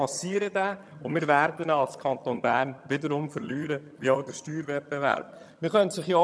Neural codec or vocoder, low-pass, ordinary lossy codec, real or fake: vocoder, 22.05 kHz, 80 mel bands, HiFi-GAN; none; none; fake